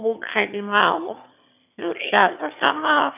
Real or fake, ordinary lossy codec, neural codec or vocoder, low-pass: fake; none; autoencoder, 22.05 kHz, a latent of 192 numbers a frame, VITS, trained on one speaker; 3.6 kHz